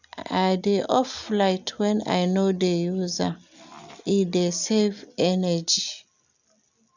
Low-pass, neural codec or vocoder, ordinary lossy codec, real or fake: 7.2 kHz; none; none; real